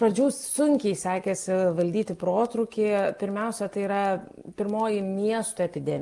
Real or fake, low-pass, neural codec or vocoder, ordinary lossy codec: real; 10.8 kHz; none; Opus, 24 kbps